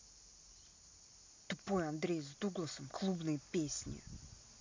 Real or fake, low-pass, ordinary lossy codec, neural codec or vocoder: real; 7.2 kHz; MP3, 48 kbps; none